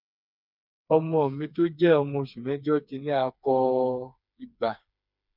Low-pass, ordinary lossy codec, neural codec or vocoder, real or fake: 5.4 kHz; none; codec, 16 kHz, 4 kbps, FreqCodec, smaller model; fake